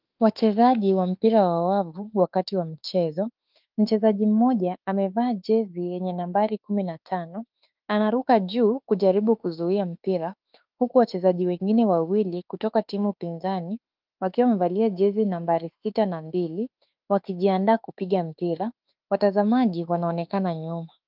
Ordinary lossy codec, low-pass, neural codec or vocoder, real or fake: Opus, 24 kbps; 5.4 kHz; autoencoder, 48 kHz, 32 numbers a frame, DAC-VAE, trained on Japanese speech; fake